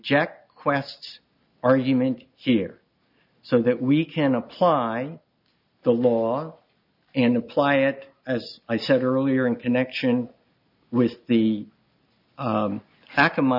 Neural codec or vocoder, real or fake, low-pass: none; real; 5.4 kHz